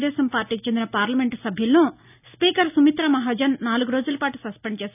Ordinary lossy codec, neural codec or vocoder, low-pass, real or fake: none; none; 3.6 kHz; real